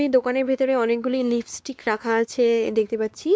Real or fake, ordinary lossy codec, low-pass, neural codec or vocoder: fake; none; none; codec, 16 kHz, 2 kbps, X-Codec, WavLM features, trained on Multilingual LibriSpeech